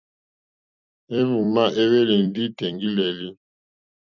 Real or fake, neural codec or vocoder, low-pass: fake; vocoder, 44.1 kHz, 128 mel bands every 256 samples, BigVGAN v2; 7.2 kHz